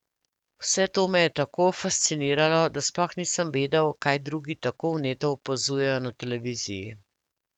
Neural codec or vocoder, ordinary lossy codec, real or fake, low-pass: codec, 44.1 kHz, 7.8 kbps, DAC; none; fake; 19.8 kHz